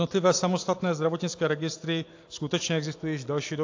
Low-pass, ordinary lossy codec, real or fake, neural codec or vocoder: 7.2 kHz; AAC, 48 kbps; real; none